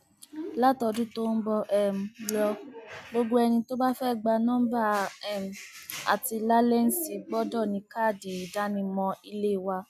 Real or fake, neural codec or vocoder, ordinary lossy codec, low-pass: real; none; none; 14.4 kHz